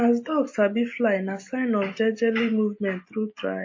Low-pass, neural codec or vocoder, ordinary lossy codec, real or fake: 7.2 kHz; none; MP3, 32 kbps; real